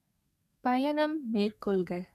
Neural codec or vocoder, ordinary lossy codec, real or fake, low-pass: codec, 32 kHz, 1.9 kbps, SNAC; none; fake; 14.4 kHz